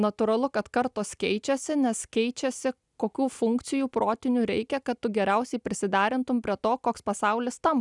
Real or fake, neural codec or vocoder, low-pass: real; none; 10.8 kHz